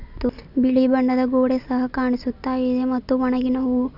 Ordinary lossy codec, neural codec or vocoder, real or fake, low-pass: none; none; real; 5.4 kHz